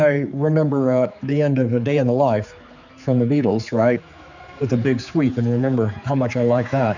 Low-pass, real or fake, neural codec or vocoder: 7.2 kHz; fake; codec, 16 kHz, 4 kbps, X-Codec, HuBERT features, trained on general audio